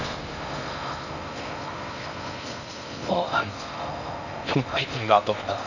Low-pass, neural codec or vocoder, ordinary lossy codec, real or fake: 7.2 kHz; codec, 16 kHz in and 24 kHz out, 0.6 kbps, FocalCodec, streaming, 4096 codes; none; fake